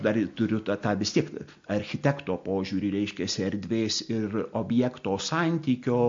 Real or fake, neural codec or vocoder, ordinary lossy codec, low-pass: real; none; MP3, 48 kbps; 7.2 kHz